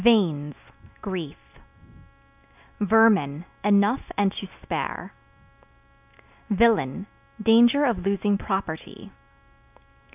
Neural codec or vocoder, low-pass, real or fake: none; 3.6 kHz; real